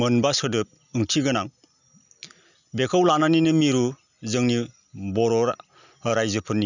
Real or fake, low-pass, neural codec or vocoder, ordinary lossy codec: real; 7.2 kHz; none; none